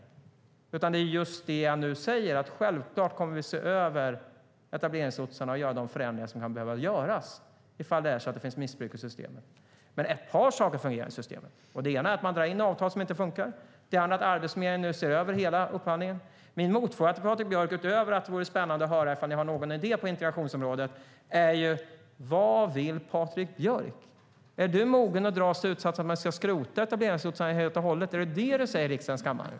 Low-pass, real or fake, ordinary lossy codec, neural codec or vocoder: none; real; none; none